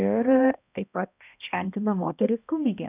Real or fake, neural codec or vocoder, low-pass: fake; codec, 16 kHz, 1 kbps, X-Codec, HuBERT features, trained on balanced general audio; 3.6 kHz